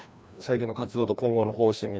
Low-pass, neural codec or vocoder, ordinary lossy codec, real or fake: none; codec, 16 kHz, 1 kbps, FreqCodec, larger model; none; fake